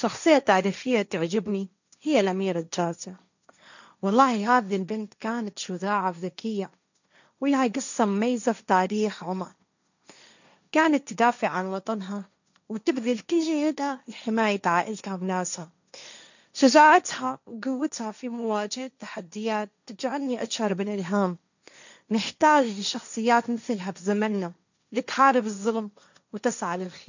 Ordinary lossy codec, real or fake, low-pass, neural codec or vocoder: none; fake; 7.2 kHz; codec, 16 kHz, 1.1 kbps, Voila-Tokenizer